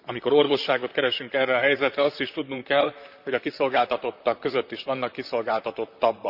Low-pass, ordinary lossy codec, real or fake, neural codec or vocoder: 5.4 kHz; none; fake; vocoder, 44.1 kHz, 128 mel bands, Pupu-Vocoder